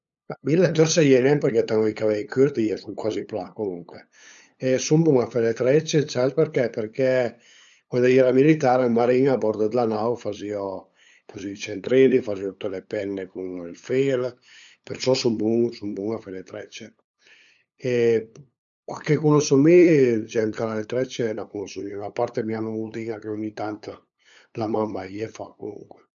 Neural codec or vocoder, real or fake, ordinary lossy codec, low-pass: codec, 16 kHz, 8 kbps, FunCodec, trained on LibriTTS, 25 frames a second; fake; none; 7.2 kHz